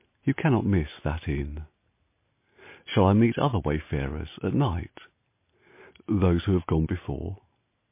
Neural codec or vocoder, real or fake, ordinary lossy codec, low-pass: none; real; MP3, 24 kbps; 3.6 kHz